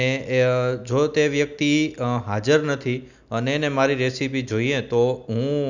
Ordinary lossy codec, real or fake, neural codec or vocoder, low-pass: none; real; none; 7.2 kHz